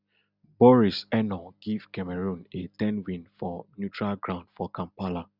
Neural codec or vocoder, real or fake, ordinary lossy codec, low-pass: none; real; none; 5.4 kHz